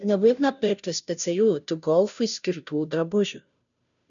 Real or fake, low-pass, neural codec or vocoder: fake; 7.2 kHz; codec, 16 kHz, 0.5 kbps, FunCodec, trained on Chinese and English, 25 frames a second